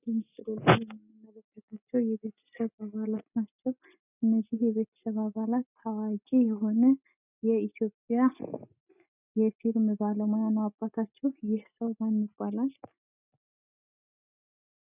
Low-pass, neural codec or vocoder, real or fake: 3.6 kHz; none; real